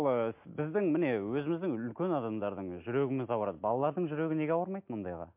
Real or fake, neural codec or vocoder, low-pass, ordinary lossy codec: real; none; 3.6 kHz; MP3, 32 kbps